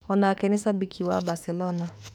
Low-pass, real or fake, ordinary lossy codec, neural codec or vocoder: 19.8 kHz; fake; none; autoencoder, 48 kHz, 32 numbers a frame, DAC-VAE, trained on Japanese speech